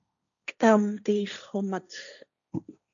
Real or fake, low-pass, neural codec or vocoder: fake; 7.2 kHz; codec, 16 kHz, 1.1 kbps, Voila-Tokenizer